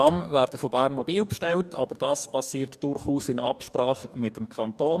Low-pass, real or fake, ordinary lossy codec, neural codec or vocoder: 14.4 kHz; fake; none; codec, 44.1 kHz, 2.6 kbps, DAC